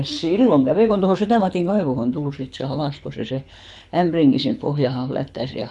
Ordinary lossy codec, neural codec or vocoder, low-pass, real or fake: none; codec, 24 kHz, 6 kbps, HILCodec; none; fake